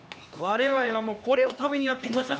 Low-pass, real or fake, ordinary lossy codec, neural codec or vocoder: none; fake; none; codec, 16 kHz, 2 kbps, X-Codec, HuBERT features, trained on LibriSpeech